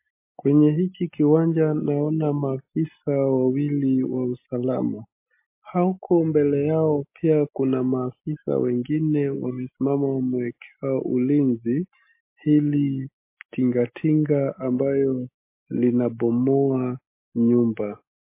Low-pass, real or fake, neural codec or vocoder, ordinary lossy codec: 3.6 kHz; real; none; MP3, 24 kbps